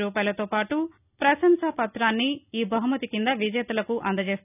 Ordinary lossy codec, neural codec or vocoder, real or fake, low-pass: none; none; real; 3.6 kHz